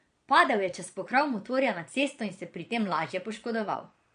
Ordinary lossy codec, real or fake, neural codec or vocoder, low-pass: MP3, 48 kbps; fake; vocoder, 22.05 kHz, 80 mel bands, Vocos; 9.9 kHz